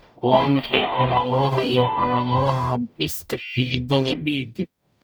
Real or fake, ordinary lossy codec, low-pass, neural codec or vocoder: fake; none; none; codec, 44.1 kHz, 0.9 kbps, DAC